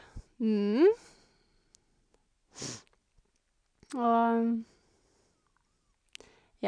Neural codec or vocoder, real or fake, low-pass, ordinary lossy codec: none; real; 9.9 kHz; none